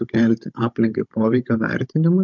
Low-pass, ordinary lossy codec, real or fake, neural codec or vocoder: 7.2 kHz; MP3, 64 kbps; fake; codec, 16 kHz, 16 kbps, FunCodec, trained on Chinese and English, 50 frames a second